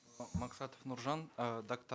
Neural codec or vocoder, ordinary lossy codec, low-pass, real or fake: none; none; none; real